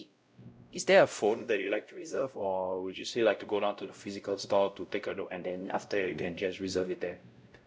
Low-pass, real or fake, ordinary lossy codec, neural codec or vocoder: none; fake; none; codec, 16 kHz, 0.5 kbps, X-Codec, WavLM features, trained on Multilingual LibriSpeech